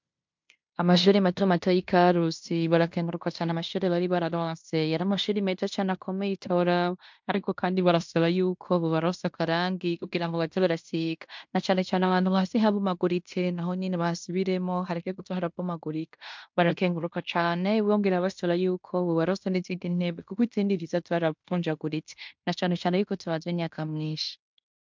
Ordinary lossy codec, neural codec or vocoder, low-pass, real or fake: MP3, 64 kbps; codec, 16 kHz in and 24 kHz out, 0.9 kbps, LongCat-Audio-Codec, fine tuned four codebook decoder; 7.2 kHz; fake